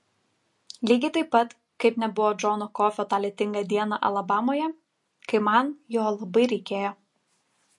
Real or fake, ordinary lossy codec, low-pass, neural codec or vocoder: real; MP3, 48 kbps; 10.8 kHz; none